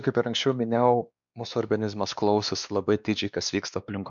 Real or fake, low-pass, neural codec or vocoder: fake; 7.2 kHz; codec, 16 kHz, 2 kbps, X-Codec, WavLM features, trained on Multilingual LibriSpeech